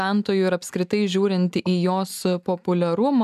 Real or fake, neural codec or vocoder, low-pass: real; none; 14.4 kHz